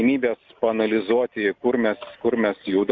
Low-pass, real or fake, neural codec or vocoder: 7.2 kHz; real; none